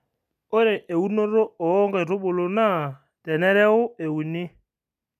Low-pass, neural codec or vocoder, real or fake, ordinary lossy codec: 14.4 kHz; none; real; none